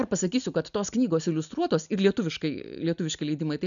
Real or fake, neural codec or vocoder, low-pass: real; none; 7.2 kHz